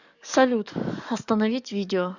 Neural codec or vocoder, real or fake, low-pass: codec, 44.1 kHz, 7.8 kbps, DAC; fake; 7.2 kHz